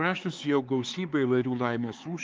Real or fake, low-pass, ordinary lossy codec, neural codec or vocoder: fake; 7.2 kHz; Opus, 32 kbps; codec, 16 kHz, 4 kbps, X-Codec, HuBERT features, trained on LibriSpeech